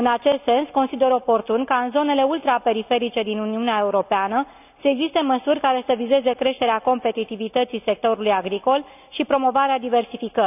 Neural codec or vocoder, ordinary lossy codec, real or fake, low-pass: none; none; real; 3.6 kHz